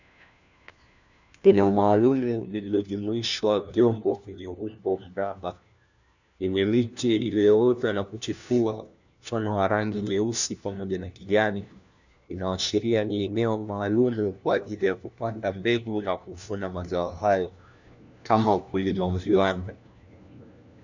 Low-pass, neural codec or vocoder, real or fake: 7.2 kHz; codec, 16 kHz, 1 kbps, FunCodec, trained on LibriTTS, 50 frames a second; fake